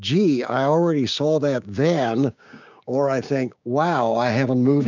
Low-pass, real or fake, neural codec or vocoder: 7.2 kHz; fake; codec, 16 kHz, 6 kbps, DAC